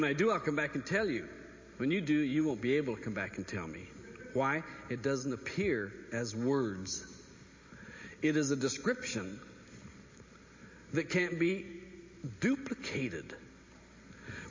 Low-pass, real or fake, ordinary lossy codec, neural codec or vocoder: 7.2 kHz; real; MP3, 32 kbps; none